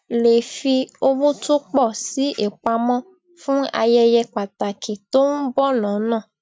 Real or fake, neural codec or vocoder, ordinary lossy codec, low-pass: real; none; none; none